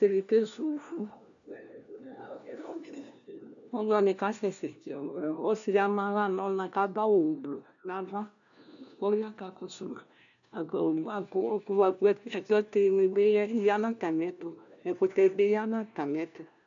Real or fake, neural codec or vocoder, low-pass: fake; codec, 16 kHz, 1 kbps, FunCodec, trained on LibriTTS, 50 frames a second; 7.2 kHz